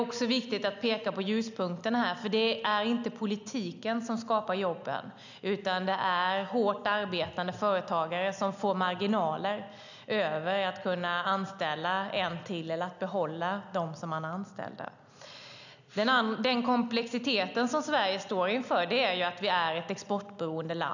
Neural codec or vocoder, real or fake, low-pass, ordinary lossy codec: none; real; 7.2 kHz; none